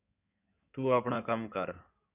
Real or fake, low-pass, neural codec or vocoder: fake; 3.6 kHz; codec, 16 kHz in and 24 kHz out, 2.2 kbps, FireRedTTS-2 codec